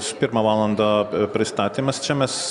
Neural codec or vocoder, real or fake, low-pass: none; real; 10.8 kHz